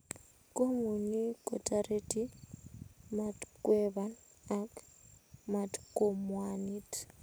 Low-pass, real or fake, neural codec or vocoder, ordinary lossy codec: none; real; none; none